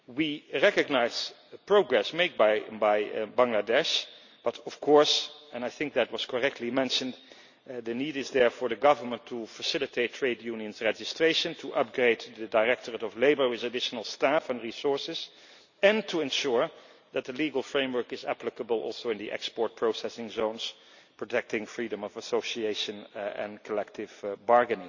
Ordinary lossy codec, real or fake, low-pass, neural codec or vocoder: none; real; 7.2 kHz; none